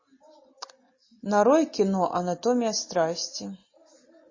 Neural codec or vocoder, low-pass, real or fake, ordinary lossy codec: none; 7.2 kHz; real; MP3, 32 kbps